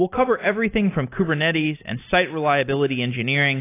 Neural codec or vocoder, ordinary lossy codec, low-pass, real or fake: none; AAC, 24 kbps; 3.6 kHz; real